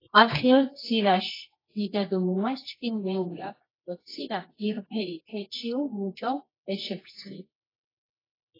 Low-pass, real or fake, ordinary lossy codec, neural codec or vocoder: 5.4 kHz; fake; AAC, 24 kbps; codec, 24 kHz, 0.9 kbps, WavTokenizer, medium music audio release